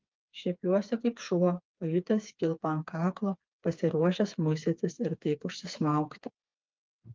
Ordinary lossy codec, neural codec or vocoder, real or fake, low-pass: Opus, 24 kbps; codec, 16 kHz, 4 kbps, FreqCodec, smaller model; fake; 7.2 kHz